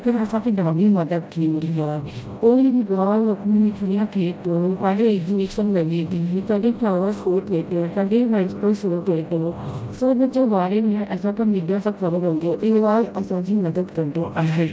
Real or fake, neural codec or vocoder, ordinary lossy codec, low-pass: fake; codec, 16 kHz, 0.5 kbps, FreqCodec, smaller model; none; none